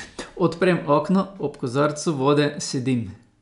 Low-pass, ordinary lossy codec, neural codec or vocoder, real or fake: 10.8 kHz; none; none; real